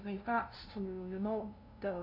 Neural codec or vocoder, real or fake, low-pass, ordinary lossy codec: codec, 16 kHz, 0.5 kbps, FunCodec, trained on LibriTTS, 25 frames a second; fake; 5.4 kHz; none